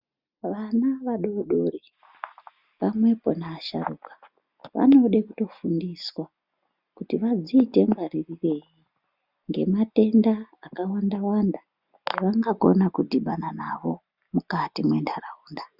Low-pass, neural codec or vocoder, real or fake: 5.4 kHz; none; real